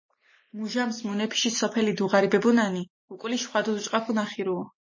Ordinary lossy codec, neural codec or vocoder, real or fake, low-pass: MP3, 32 kbps; none; real; 7.2 kHz